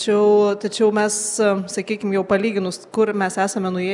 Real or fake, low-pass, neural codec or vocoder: real; 10.8 kHz; none